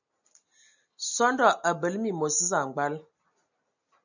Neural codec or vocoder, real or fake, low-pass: none; real; 7.2 kHz